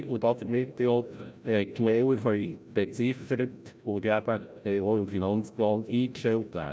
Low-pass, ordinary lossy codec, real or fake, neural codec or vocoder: none; none; fake; codec, 16 kHz, 0.5 kbps, FreqCodec, larger model